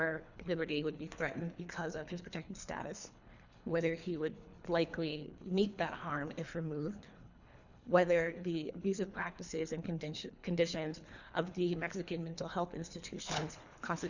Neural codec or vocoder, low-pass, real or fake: codec, 24 kHz, 3 kbps, HILCodec; 7.2 kHz; fake